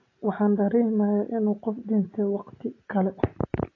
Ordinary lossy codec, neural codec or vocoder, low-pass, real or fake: AAC, 32 kbps; none; 7.2 kHz; real